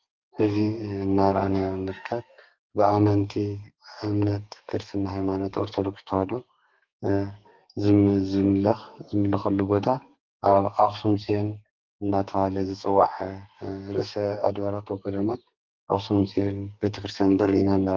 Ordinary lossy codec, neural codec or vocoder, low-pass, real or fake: Opus, 32 kbps; codec, 32 kHz, 1.9 kbps, SNAC; 7.2 kHz; fake